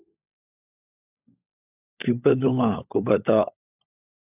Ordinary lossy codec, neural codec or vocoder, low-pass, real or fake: AAC, 32 kbps; codec, 16 kHz, 16 kbps, FunCodec, trained on LibriTTS, 50 frames a second; 3.6 kHz; fake